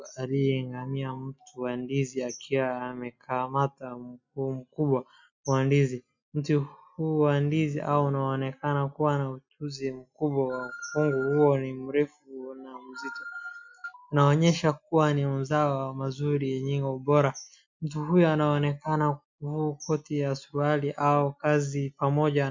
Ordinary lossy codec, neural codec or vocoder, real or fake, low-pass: MP3, 64 kbps; none; real; 7.2 kHz